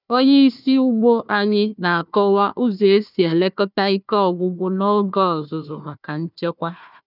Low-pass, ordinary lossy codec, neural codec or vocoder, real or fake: 5.4 kHz; none; codec, 16 kHz, 1 kbps, FunCodec, trained on Chinese and English, 50 frames a second; fake